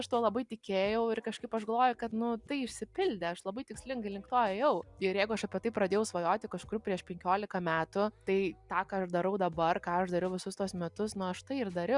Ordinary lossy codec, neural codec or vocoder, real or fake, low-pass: Opus, 64 kbps; none; real; 10.8 kHz